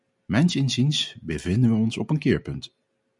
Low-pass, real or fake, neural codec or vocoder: 10.8 kHz; real; none